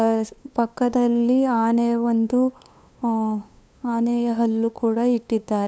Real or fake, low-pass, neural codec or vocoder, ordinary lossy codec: fake; none; codec, 16 kHz, 2 kbps, FunCodec, trained on LibriTTS, 25 frames a second; none